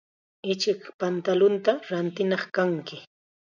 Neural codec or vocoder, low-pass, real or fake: none; 7.2 kHz; real